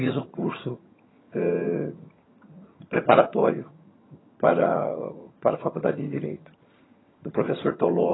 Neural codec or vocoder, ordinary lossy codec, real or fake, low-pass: vocoder, 22.05 kHz, 80 mel bands, HiFi-GAN; AAC, 16 kbps; fake; 7.2 kHz